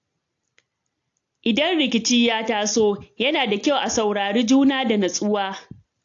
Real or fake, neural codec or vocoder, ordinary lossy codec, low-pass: real; none; AAC, 48 kbps; 7.2 kHz